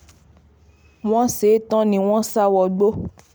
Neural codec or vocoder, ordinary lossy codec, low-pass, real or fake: none; none; none; real